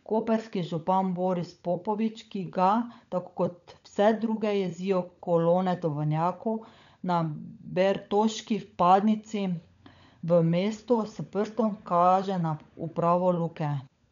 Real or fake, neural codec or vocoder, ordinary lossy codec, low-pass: fake; codec, 16 kHz, 16 kbps, FunCodec, trained on LibriTTS, 50 frames a second; none; 7.2 kHz